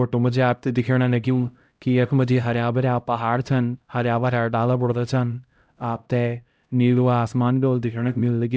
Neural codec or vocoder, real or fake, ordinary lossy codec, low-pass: codec, 16 kHz, 0.5 kbps, X-Codec, HuBERT features, trained on LibriSpeech; fake; none; none